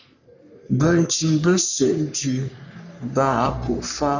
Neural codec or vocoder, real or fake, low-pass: codec, 44.1 kHz, 3.4 kbps, Pupu-Codec; fake; 7.2 kHz